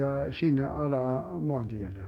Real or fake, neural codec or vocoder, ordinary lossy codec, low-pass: fake; codec, 44.1 kHz, 2.6 kbps, DAC; none; 19.8 kHz